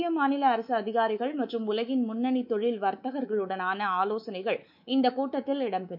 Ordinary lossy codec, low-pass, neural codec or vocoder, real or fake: none; 5.4 kHz; codec, 24 kHz, 3.1 kbps, DualCodec; fake